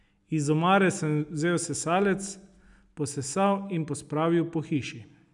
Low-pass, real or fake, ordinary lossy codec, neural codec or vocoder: 10.8 kHz; real; none; none